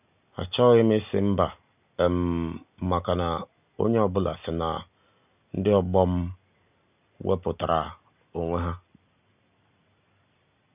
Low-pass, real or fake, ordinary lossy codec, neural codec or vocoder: 3.6 kHz; real; none; none